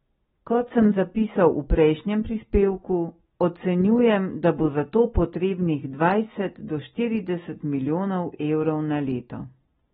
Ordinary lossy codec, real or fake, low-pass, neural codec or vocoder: AAC, 16 kbps; real; 19.8 kHz; none